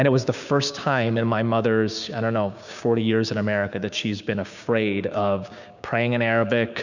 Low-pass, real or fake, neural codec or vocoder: 7.2 kHz; fake; codec, 16 kHz, 6 kbps, DAC